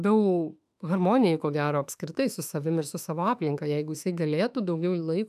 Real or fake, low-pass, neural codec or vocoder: fake; 14.4 kHz; autoencoder, 48 kHz, 32 numbers a frame, DAC-VAE, trained on Japanese speech